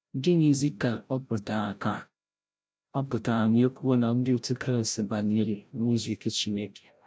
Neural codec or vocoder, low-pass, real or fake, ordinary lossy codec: codec, 16 kHz, 0.5 kbps, FreqCodec, larger model; none; fake; none